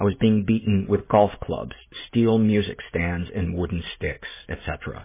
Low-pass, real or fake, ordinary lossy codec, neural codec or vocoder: 3.6 kHz; real; MP3, 16 kbps; none